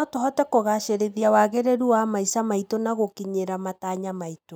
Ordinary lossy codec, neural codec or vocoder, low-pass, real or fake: none; none; none; real